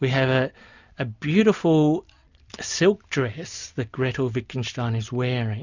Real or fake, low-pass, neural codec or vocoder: real; 7.2 kHz; none